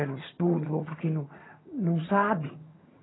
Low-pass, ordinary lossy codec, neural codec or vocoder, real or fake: 7.2 kHz; AAC, 16 kbps; vocoder, 22.05 kHz, 80 mel bands, HiFi-GAN; fake